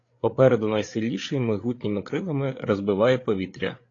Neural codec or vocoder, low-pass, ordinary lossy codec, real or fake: codec, 16 kHz, 8 kbps, FreqCodec, larger model; 7.2 kHz; AAC, 32 kbps; fake